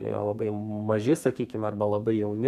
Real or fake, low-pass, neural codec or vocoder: fake; 14.4 kHz; codec, 32 kHz, 1.9 kbps, SNAC